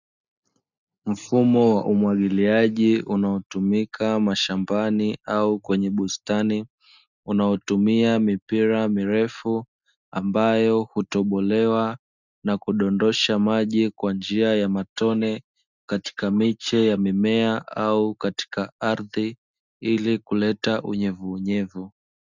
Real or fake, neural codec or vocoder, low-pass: real; none; 7.2 kHz